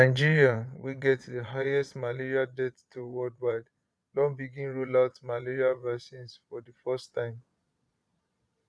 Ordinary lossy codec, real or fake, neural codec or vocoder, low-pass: none; fake; vocoder, 22.05 kHz, 80 mel bands, Vocos; none